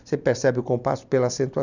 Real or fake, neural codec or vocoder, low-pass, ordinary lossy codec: real; none; 7.2 kHz; none